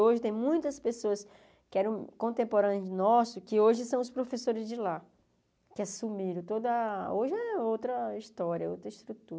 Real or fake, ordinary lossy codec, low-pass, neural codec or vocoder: real; none; none; none